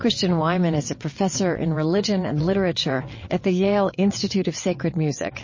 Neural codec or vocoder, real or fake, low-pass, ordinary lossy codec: vocoder, 22.05 kHz, 80 mel bands, WaveNeXt; fake; 7.2 kHz; MP3, 32 kbps